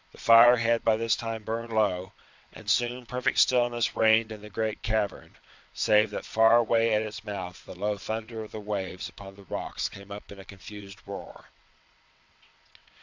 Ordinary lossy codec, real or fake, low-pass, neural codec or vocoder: MP3, 64 kbps; fake; 7.2 kHz; vocoder, 22.05 kHz, 80 mel bands, WaveNeXt